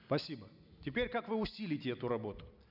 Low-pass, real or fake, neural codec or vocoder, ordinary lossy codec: 5.4 kHz; real; none; none